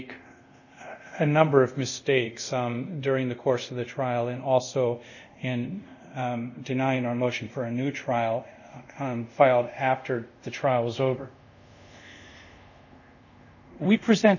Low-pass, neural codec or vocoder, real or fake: 7.2 kHz; codec, 24 kHz, 0.5 kbps, DualCodec; fake